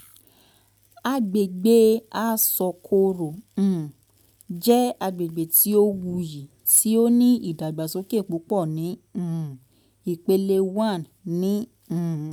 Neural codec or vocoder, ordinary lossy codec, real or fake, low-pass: none; none; real; none